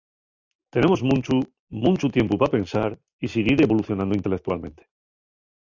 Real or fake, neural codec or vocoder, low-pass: real; none; 7.2 kHz